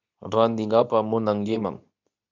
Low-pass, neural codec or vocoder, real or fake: 7.2 kHz; codec, 24 kHz, 0.9 kbps, WavTokenizer, medium speech release version 2; fake